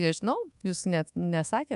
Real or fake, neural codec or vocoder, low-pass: fake; codec, 24 kHz, 1.2 kbps, DualCodec; 10.8 kHz